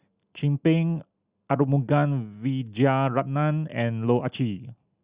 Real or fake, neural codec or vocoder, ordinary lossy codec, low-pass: real; none; Opus, 64 kbps; 3.6 kHz